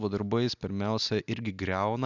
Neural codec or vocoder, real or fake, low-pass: none; real; 7.2 kHz